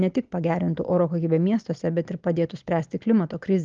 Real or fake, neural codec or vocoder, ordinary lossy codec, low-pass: real; none; Opus, 32 kbps; 7.2 kHz